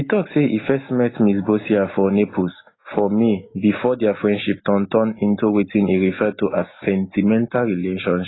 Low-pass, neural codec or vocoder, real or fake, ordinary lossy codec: 7.2 kHz; none; real; AAC, 16 kbps